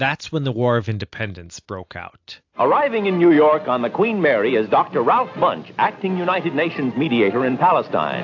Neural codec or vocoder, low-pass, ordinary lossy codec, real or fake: none; 7.2 kHz; AAC, 48 kbps; real